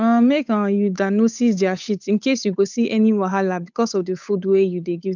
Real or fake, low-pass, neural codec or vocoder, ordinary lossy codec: fake; 7.2 kHz; codec, 16 kHz, 8 kbps, FunCodec, trained on Chinese and English, 25 frames a second; none